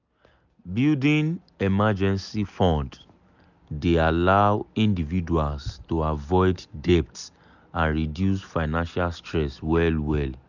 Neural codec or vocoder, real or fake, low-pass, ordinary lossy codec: none; real; 7.2 kHz; none